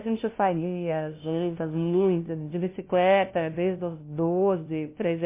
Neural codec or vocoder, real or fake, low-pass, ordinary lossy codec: codec, 16 kHz, 0.5 kbps, FunCodec, trained on LibriTTS, 25 frames a second; fake; 3.6 kHz; MP3, 24 kbps